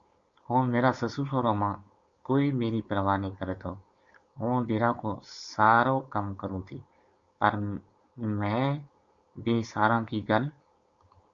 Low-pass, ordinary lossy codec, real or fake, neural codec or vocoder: 7.2 kHz; Opus, 64 kbps; fake; codec, 16 kHz, 4.8 kbps, FACodec